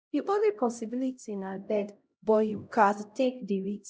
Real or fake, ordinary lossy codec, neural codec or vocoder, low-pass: fake; none; codec, 16 kHz, 0.5 kbps, X-Codec, HuBERT features, trained on LibriSpeech; none